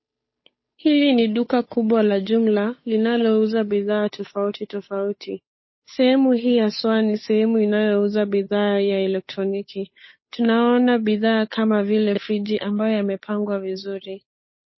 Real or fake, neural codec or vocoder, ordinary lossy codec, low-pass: fake; codec, 16 kHz, 8 kbps, FunCodec, trained on Chinese and English, 25 frames a second; MP3, 24 kbps; 7.2 kHz